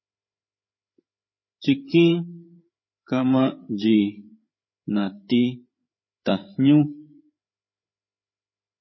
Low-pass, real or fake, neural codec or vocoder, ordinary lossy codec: 7.2 kHz; fake; codec, 16 kHz, 8 kbps, FreqCodec, larger model; MP3, 24 kbps